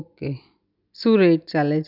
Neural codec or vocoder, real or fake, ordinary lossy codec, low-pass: none; real; none; 5.4 kHz